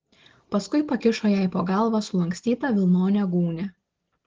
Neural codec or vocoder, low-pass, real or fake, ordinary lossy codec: none; 7.2 kHz; real; Opus, 16 kbps